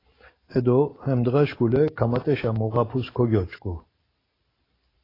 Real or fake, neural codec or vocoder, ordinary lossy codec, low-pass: real; none; AAC, 24 kbps; 5.4 kHz